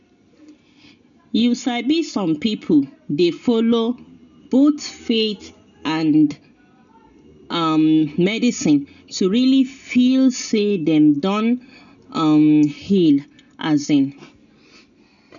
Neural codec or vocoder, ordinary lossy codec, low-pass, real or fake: none; none; 7.2 kHz; real